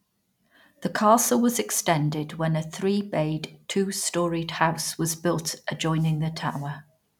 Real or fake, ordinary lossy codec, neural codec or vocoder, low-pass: real; none; none; 19.8 kHz